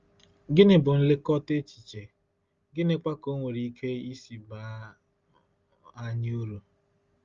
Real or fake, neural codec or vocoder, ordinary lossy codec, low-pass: real; none; Opus, 32 kbps; 7.2 kHz